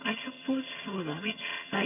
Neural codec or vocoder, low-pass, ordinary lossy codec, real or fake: vocoder, 22.05 kHz, 80 mel bands, HiFi-GAN; 3.6 kHz; none; fake